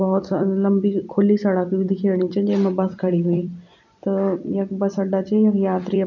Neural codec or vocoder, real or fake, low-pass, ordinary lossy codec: vocoder, 44.1 kHz, 128 mel bands every 256 samples, BigVGAN v2; fake; 7.2 kHz; none